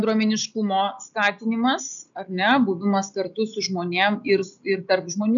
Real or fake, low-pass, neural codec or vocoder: real; 7.2 kHz; none